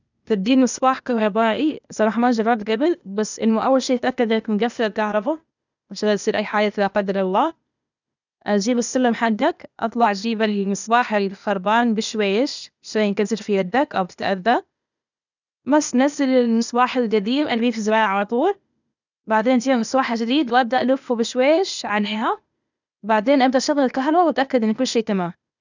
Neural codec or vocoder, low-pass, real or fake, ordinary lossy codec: codec, 16 kHz, 0.8 kbps, ZipCodec; 7.2 kHz; fake; none